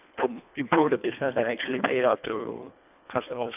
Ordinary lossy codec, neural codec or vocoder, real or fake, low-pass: none; codec, 24 kHz, 1.5 kbps, HILCodec; fake; 3.6 kHz